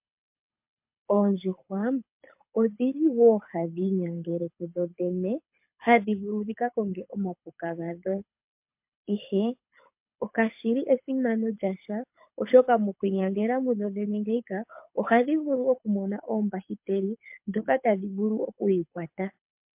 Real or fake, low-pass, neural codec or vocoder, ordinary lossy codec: fake; 3.6 kHz; codec, 24 kHz, 6 kbps, HILCodec; MP3, 32 kbps